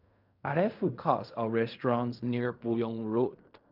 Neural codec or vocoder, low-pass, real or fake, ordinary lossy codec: codec, 16 kHz in and 24 kHz out, 0.4 kbps, LongCat-Audio-Codec, fine tuned four codebook decoder; 5.4 kHz; fake; none